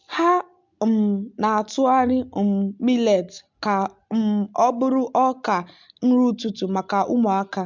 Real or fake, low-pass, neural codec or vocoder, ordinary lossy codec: real; 7.2 kHz; none; MP3, 64 kbps